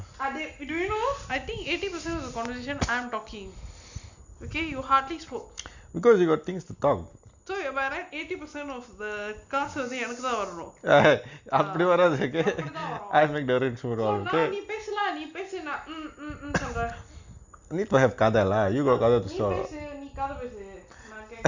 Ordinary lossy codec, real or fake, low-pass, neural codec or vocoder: Opus, 64 kbps; real; 7.2 kHz; none